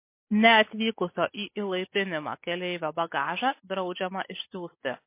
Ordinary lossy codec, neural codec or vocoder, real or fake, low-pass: MP3, 24 kbps; none; real; 3.6 kHz